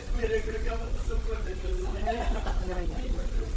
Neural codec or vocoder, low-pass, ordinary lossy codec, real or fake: codec, 16 kHz, 8 kbps, FreqCodec, larger model; none; none; fake